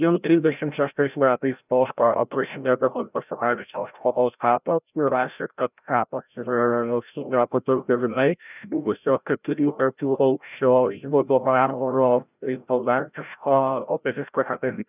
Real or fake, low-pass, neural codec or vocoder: fake; 3.6 kHz; codec, 16 kHz, 0.5 kbps, FreqCodec, larger model